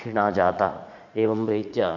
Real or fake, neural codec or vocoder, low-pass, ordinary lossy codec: fake; vocoder, 44.1 kHz, 80 mel bands, Vocos; 7.2 kHz; MP3, 48 kbps